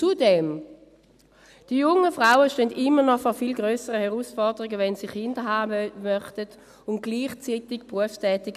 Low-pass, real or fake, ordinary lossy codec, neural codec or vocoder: 14.4 kHz; real; none; none